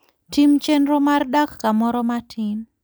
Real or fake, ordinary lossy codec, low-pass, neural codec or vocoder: real; none; none; none